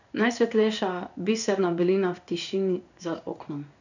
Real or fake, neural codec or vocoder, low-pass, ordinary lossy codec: fake; codec, 16 kHz in and 24 kHz out, 1 kbps, XY-Tokenizer; 7.2 kHz; none